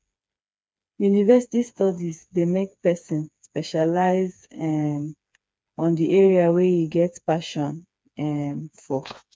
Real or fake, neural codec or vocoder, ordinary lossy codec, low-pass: fake; codec, 16 kHz, 4 kbps, FreqCodec, smaller model; none; none